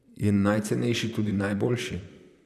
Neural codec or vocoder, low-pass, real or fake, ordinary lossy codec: vocoder, 44.1 kHz, 128 mel bands, Pupu-Vocoder; 14.4 kHz; fake; none